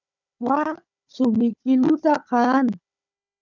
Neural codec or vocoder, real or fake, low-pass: codec, 16 kHz, 4 kbps, FunCodec, trained on Chinese and English, 50 frames a second; fake; 7.2 kHz